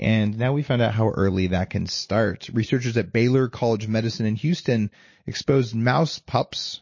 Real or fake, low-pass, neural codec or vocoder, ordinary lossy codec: real; 7.2 kHz; none; MP3, 32 kbps